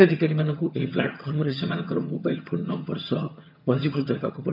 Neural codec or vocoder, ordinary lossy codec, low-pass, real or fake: vocoder, 22.05 kHz, 80 mel bands, HiFi-GAN; none; 5.4 kHz; fake